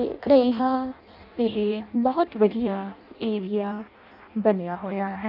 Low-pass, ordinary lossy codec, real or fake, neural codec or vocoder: 5.4 kHz; none; fake; codec, 16 kHz in and 24 kHz out, 0.6 kbps, FireRedTTS-2 codec